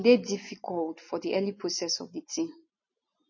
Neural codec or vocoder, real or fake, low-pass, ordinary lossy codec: none; real; 7.2 kHz; MP3, 32 kbps